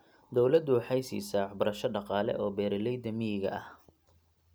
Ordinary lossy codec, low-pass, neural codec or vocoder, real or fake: none; none; none; real